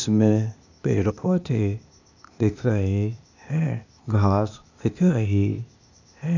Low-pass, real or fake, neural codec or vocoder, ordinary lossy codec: 7.2 kHz; fake; codec, 16 kHz, 0.8 kbps, ZipCodec; none